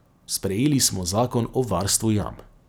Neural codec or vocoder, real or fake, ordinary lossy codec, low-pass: none; real; none; none